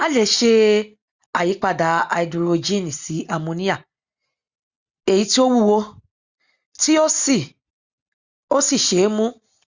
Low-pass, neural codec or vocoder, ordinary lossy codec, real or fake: 7.2 kHz; none; Opus, 64 kbps; real